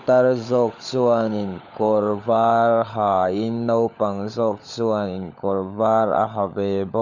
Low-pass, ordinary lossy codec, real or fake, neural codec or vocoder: 7.2 kHz; none; fake; codec, 16 kHz, 16 kbps, FunCodec, trained on LibriTTS, 50 frames a second